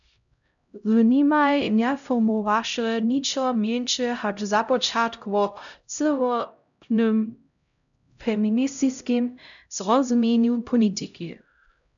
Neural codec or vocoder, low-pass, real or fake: codec, 16 kHz, 0.5 kbps, X-Codec, HuBERT features, trained on LibriSpeech; 7.2 kHz; fake